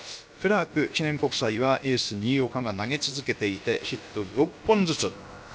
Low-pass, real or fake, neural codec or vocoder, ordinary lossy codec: none; fake; codec, 16 kHz, about 1 kbps, DyCAST, with the encoder's durations; none